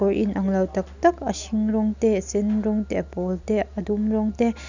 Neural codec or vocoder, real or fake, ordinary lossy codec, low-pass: none; real; none; 7.2 kHz